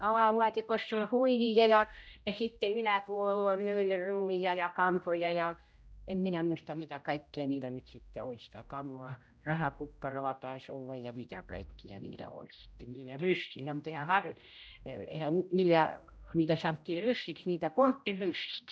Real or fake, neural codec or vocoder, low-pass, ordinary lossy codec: fake; codec, 16 kHz, 0.5 kbps, X-Codec, HuBERT features, trained on general audio; none; none